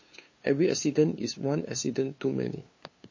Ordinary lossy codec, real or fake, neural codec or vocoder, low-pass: MP3, 32 kbps; fake; codec, 16 kHz, 2 kbps, FunCodec, trained on LibriTTS, 25 frames a second; 7.2 kHz